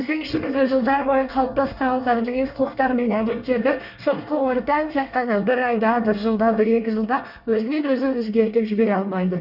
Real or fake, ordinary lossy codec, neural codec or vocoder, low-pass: fake; none; codec, 24 kHz, 1 kbps, SNAC; 5.4 kHz